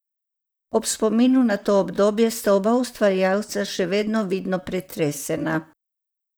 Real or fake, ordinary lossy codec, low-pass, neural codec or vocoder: fake; none; none; vocoder, 44.1 kHz, 128 mel bands, Pupu-Vocoder